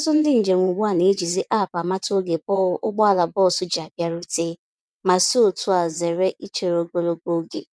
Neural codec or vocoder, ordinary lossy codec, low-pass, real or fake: vocoder, 22.05 kHz, 80 mel bands, WaveNeXt; none; none; fake